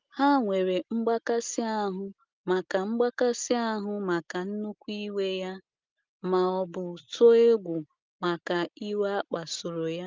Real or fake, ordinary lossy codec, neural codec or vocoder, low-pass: real; Opus, 32 kbps; none; 7.2 kHz